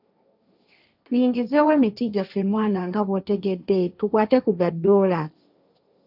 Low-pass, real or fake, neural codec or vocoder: 5.4 kHz; fake; codec, 16 kHz, 1.1 kbps, Voila-Tokenizer